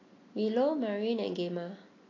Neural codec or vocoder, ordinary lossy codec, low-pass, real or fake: none; AAC, 32 kbps; 7.2 kHz; real